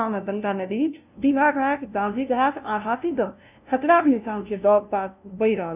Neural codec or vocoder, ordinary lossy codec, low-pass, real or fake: codec, 16 kHz, 0.5 kbps, FunCodec, trained on LibriTTS, 25 frames a second; none; 3.6 kHz; fake